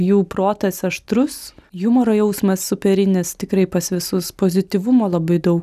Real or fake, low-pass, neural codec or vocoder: real; 14.4 kHz; none